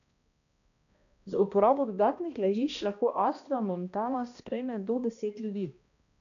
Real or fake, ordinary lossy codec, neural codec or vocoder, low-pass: fake; none; codec, 16 kHz, 1 kbps, X-Codec, HuBERT features, trained on balanced general audio; 7.2 kHz